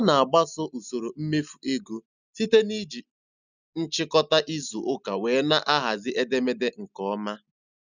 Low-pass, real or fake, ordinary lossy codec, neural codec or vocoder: 7.2 kHz; real; none; none